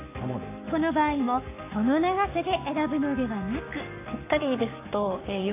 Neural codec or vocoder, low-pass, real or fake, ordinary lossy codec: codec, 44.1 kHz, 7.8 kbps, Pupu-Codec; 3.6 kHz; fake; AAC, 24 kbps